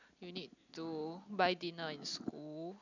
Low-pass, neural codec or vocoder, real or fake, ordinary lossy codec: 7.2 kHz; none; real; none